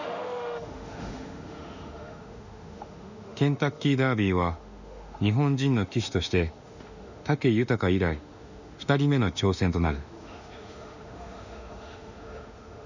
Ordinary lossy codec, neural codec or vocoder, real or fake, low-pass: none; autoencoder, 48 kHz, 32 numbers a frame, DAC-VAE, trained on Japanese speech; fake; 7.2 kHz